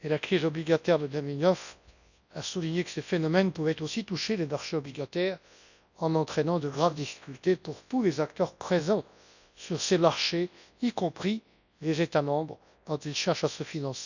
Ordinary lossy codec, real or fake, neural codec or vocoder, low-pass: none; fake; codec, 24 kHz, 0.9 kbps, WavTokenizer, large speech release; 7.2 kHz